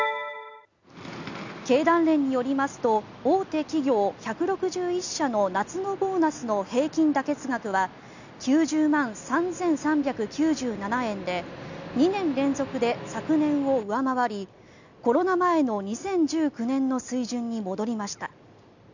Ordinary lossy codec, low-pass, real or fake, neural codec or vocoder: none; 7.2 kHz; real; none